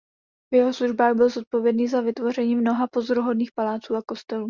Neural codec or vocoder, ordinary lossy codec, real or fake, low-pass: none; Opus, 64 kbps; real; 7.2 kHz